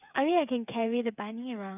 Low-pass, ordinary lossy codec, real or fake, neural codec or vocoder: 3.6 kHz; none; fake; codec, 16 kHz, 8 kbps, FreqCodec, smaller model